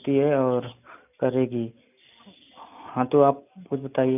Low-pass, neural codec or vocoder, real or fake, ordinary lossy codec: 3.6 kHz; none; real; none